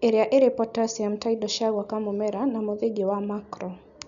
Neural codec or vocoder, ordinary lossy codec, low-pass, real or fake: none; none; 7.2 kHz; real